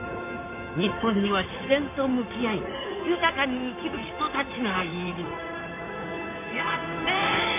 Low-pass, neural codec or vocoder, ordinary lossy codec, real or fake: 3.6 kHz; codec, 16 kHz in and 24 kHz out, 2.2 kbps, FireRedTTS-2 codec; MP3, 32 kbps; fake